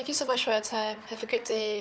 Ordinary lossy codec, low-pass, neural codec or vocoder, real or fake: none; none; codec, 16 kHz, 4 kbps, FunCodec, trained on Chinese and English, 50 frames a second; fake